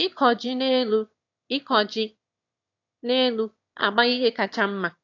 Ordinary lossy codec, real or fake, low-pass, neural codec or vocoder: AAC, 48 kbps; fake; 7.2 kHz; autoencoder, 22.05 kHz, a latent of 192 numbers a frame, VITS, trained on one speaker